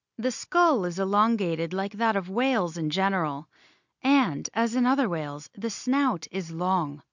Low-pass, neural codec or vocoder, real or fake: 7.2 kHz; none; real